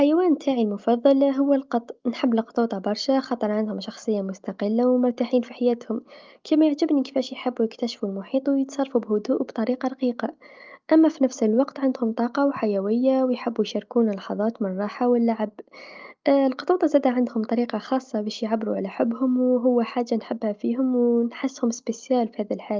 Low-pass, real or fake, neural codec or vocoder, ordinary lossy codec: 7.2 kHz; real; none; Opus, 32 kbps